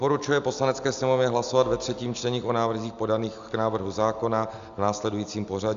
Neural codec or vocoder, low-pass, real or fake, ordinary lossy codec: none; 7.2 kHz; real; Opus, 64 kbps